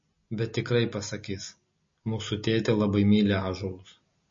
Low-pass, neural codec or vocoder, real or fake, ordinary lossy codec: 7.2 kHz; none; real; MP3, 32 kbps